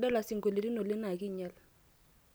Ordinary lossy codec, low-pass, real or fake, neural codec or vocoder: none; none; real; none